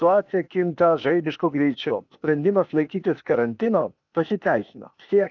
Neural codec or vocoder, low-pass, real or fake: codec, 16 kHz, 0.8 kbps, ZipCodec; 7.2 kHz; fake